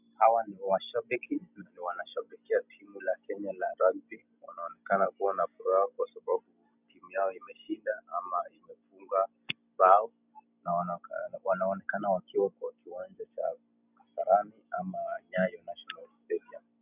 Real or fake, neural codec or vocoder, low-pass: real; none; 3.6 kHz